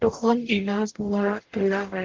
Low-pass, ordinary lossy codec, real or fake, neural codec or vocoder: 7.2 kHz; Opus, 16 kbps; fake; codec, 44.1 kHz, 0.9 kbps, DAC